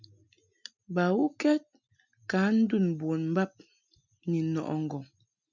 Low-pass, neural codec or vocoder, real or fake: 7.2 kHz; none; real